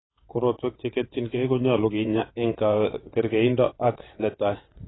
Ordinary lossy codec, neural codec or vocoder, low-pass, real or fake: AAC, 16 kbps; vocoder, 22.05 kHz, 80 mel bands, WaveNeXt; 7.2 kHz; fake